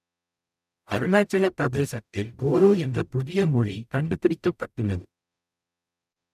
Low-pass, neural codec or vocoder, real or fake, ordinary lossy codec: 14.4 kHz; codec, 44.1 kHz, 0.9 kbps, DAC; fake; none